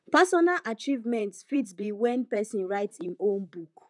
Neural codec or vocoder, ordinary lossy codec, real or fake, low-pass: vocoder, 44.1 kHz, 128 mel bands, Pupu-Vocoder; none; fake; 10.8 kHz